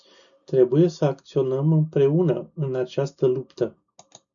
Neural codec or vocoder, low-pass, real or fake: none; 7.2 kHz; real